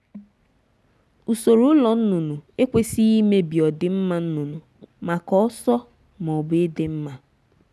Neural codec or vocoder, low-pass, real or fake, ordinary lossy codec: none; none; real; none